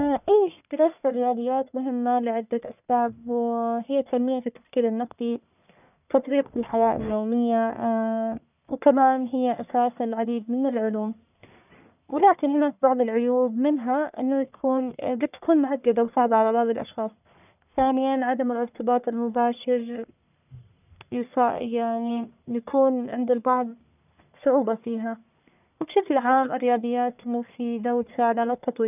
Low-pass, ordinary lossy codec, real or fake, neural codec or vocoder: 3.6 kHz; none; fake; codec, 44.1 kHz, 1.7 kbps, Pupu-Codec